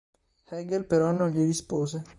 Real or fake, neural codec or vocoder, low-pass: fake; vocoder, 44.1 kHz, 128 mel bands, Pupu-Vocoder; 10.8 kHz